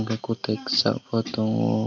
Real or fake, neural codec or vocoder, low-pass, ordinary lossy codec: real; none; 7.2 kHz; none